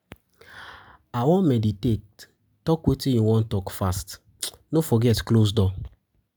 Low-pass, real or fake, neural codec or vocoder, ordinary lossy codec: none; real; none; none